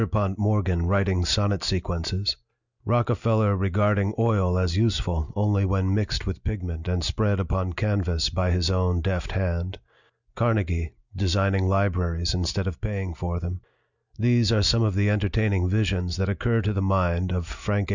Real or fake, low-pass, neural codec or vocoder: real; 7.2 kHz; none